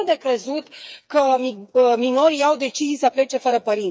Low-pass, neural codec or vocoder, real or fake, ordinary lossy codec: none; codec, 16 kHz, 4 kbps, FreqCodec, smaller model; fake; none